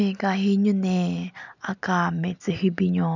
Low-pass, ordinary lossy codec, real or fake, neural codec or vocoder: 7.2 kHz; none; real; none